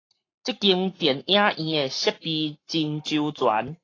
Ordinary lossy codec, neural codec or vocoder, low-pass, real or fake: AAC, 32 kbps; codec, 44.1 kHz, 7.8 kbps, Pupu-Codec; 7.2 kHz; fake